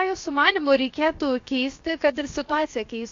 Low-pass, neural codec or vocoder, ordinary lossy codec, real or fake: 7.2 kHz; codec, 16 kHz, about 1 kbps, DyCAST, with the encoder's durations; AAC, 48 kbps; fake